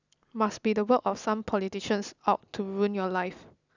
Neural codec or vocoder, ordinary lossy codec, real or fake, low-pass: none; none; real; 7.2 kHz